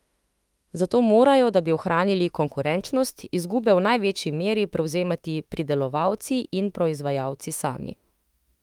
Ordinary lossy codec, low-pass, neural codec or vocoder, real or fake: Opus, 32 kbps; 19.8 kHz; autoencoder, 48 kHz, 32 numbers a frame, DAC-VAE, trained on Japanese speech; fake